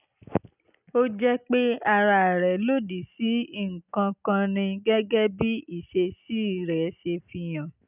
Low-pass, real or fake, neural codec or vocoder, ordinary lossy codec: 3.6 kHz; real; none; none